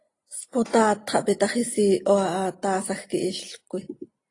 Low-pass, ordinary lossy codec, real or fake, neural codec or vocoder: 10.8 kHz; AAC, 32 kbps; real; none